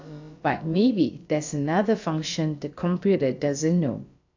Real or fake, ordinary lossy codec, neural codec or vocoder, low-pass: fake; AAC, 48 kbps; codec, 16 kHz, about 1 kbps, DyCAST, with the encoder's durations; 7.2 kHz